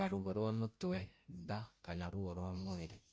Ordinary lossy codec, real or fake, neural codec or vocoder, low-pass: none; fake; codec, 16 kHz, 0.5 kbps, FunCodec, trained on Chinese and English, 25 frames a second; none